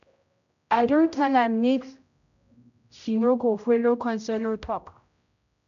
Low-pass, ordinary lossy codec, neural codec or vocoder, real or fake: 7.2 kHz; none; codec, 16 kHz, 0.5 kbps, X-Codec, HuBERT features, trained on general audio; fake